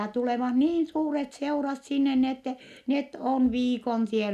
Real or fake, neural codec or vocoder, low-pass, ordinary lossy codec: real; none; 14.4 kHz; none